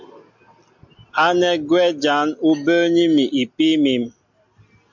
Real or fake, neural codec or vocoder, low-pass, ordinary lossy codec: real; none; 7.2 kHz; MP3, 64 kbps